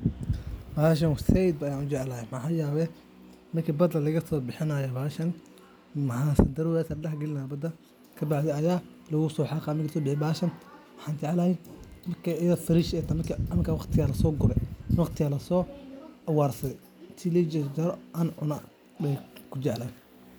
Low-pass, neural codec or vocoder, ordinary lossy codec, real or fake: none; none; none; real